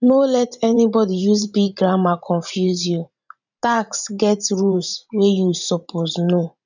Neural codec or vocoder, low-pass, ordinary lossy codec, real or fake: vocoder, 44.1 kHz, 128 mel bands every 512 samples, BigVGAN v2; 7.2 kHz; none; fake